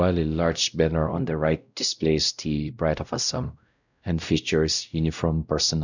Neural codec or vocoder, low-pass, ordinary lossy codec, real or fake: codec, 16 kHz, 0.5 kbps, X-Codec, WavLM features, trained on Multilingual LibriSpeech; 7.2 kHz; none; fake